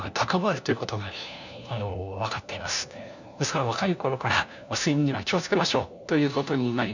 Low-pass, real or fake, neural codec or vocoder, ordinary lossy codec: 7.2 kHz; fake; codec, 16 kHz, 1 kbps, FunCodec, trained on LibriTTS, 50 frames a second; none